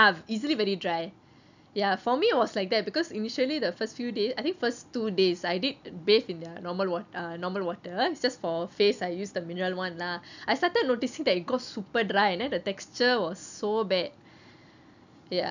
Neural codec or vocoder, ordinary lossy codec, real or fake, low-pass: none; none; real; 7.2 kHz